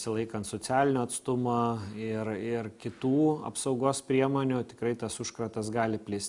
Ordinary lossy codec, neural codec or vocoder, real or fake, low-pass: MP3, 96 kbps; none; real; 10.8 kHz